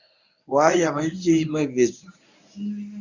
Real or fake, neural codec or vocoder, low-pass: fake; codec, 24 kHz, 0.9 kbps, WavTokenizer, medium speech release version 1; 7.2 kHz